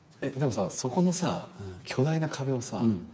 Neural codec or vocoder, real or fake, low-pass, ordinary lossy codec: codec, 16 kHz, 4 kbps, FreqCodec, smaller model; fake; none; none